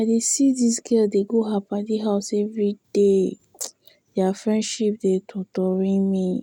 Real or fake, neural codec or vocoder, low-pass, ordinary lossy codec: real; none; 19.8 kHz; none